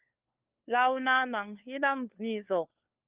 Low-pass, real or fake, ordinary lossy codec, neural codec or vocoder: 3.6 kHz; fake; Opus, 32 kbps; codec, 16 kHz, 4 kbps, FunCodec, trained on LibriTTS, 50 frames a second